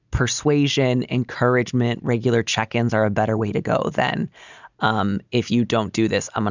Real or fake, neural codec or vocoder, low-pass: real; none; 7.2 kHz